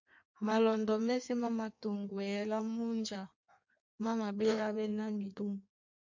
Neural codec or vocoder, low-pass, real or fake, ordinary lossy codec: codec, 16 kHz in and 24 kHz out, 1.1 kbps, FireRedTTS-2 codec; 7.2 kHz; fake; MP3, 64 kbps